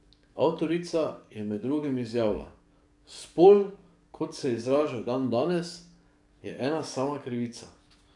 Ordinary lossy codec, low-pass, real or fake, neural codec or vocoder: none; 10.8 kHz; fake; codec, 44.1 kHz, 7.8 kbps, DAC